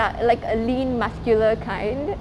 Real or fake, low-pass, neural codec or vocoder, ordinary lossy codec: real; none; none; none